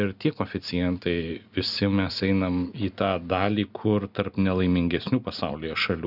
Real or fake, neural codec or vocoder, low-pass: real; none; 5.4 kHz